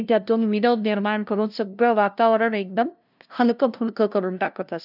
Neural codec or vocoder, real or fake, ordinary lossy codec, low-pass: codec, 16 kHz, 0.5 kbps, FunCodec, trained on LibriTTS, 25 frames a second; fake; none; 5.4 kHz